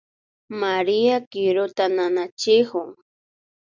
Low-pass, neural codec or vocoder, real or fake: 7.2 kHz; none; real